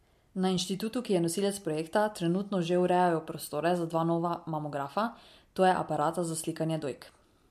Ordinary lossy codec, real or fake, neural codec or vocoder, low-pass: MP3, 64 kbps; real; none; 14.4 kHz